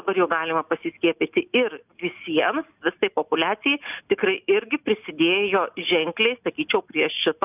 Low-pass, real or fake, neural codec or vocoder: 3.6 kHz; real; none